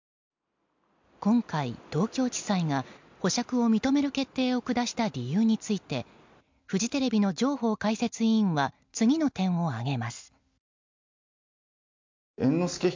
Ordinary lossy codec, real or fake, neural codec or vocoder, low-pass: MP3, 64 kbps; real; none; 7.2 kHz